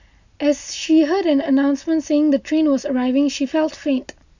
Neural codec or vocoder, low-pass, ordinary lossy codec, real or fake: none; 7.2 kHz; none; real